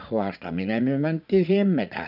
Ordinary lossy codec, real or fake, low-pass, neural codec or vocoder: none; fake; 5.4 kHz; vocoder, 44.1 kHz, 80 mel bands, Vocos